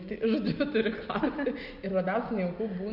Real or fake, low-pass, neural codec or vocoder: real; 5.4 kHz; none